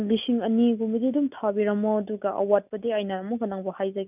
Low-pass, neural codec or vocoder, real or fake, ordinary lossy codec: 3.6 kHz; none; real; none